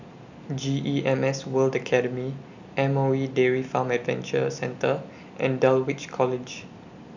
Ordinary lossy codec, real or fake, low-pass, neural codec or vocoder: none; real; 7.2 kHz; none